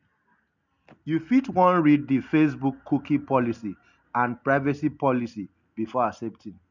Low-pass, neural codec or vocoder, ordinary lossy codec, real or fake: 7.2 kHz; none; none; real